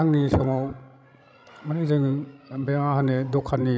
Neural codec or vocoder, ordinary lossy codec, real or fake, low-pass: codec, 16 kHz, 16 kbps, FreqCodec, larger model; none; fake; none